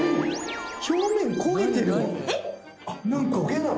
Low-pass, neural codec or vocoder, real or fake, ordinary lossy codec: none; none; real; none